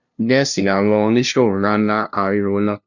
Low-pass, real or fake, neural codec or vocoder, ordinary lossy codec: 7.2 kHz; fake; codec, 16 kHz, 0.5 kbps, FunCodec, trained on LibriTTS, 25 frames a second; none